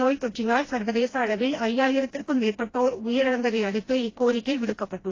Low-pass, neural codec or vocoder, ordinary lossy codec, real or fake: 7.2 kHz; codec, 16 kHz, 1 kbps, FreqCodec, smaller model; AAC, 32 kbps; fake